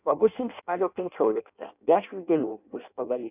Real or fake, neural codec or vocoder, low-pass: fake; codec, 16 kHz in and 24 kHz out, 0.6 kbps, FireRedTTS-2 codec; 3.6 kHz